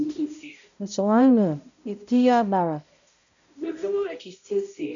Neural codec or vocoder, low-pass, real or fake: codec, 16 kHz, 0.5 kbps, X-Codec, HuBERT features, trained on balanced general audio; 7.2 kHz; fake